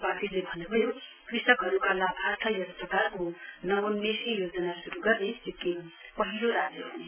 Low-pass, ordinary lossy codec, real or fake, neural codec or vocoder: 3.6 kHz; none; real; none